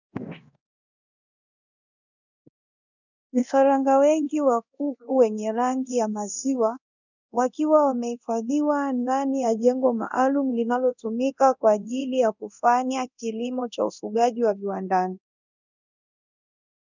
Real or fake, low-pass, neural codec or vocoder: fake; 7.2 kHz; codec, 24 kHz, 0.9 kbps, DualCodec